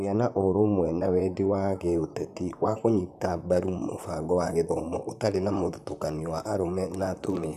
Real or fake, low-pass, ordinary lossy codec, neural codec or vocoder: fake; 14.4 kHz; none; vocoder, 44.1 kHz, 128 mel bands, Pupu-Vocoder